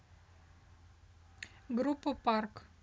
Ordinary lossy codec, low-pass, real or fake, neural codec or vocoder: none; none; real; none